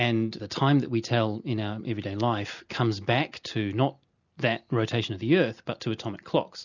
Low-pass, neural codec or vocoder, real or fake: 7.2 kHz; none; real